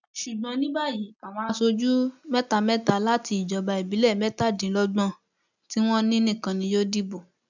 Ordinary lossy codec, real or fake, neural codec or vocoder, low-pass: none; real; none; 7.2 kHz